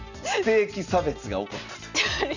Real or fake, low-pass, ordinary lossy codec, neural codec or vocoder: real; 7.2 kHz; none; none